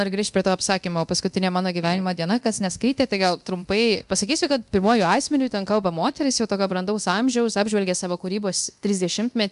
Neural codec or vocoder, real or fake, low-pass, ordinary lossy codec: codec, 24 kHz, 0.9 kbps, DualCodec; fake; 10.8 kHz; MP3, 96 kbps